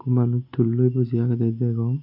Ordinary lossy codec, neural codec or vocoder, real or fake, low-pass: MP3, 48 kbps; none; real; 5.4 kHz